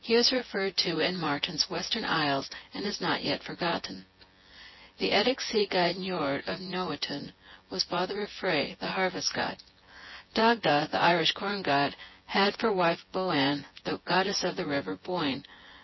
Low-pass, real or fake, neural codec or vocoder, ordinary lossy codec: 7.2 kHz; fake; vocoder, 24 kHz, 100 mel bands, Vocos; MP3, 24 kbps